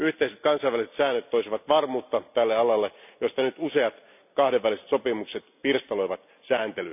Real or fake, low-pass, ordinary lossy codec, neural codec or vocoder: real; 3.6 kHz; none; none